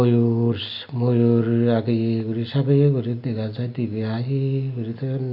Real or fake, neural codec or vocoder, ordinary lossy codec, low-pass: real; none; none; 5.4 kHz